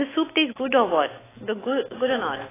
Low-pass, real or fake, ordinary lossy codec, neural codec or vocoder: 3.6 kHz; fake; AAC, 16 kbps; autoencoder, 48 kHz, 128 numbers a frame, DAC-VAE, trained on Japanese speech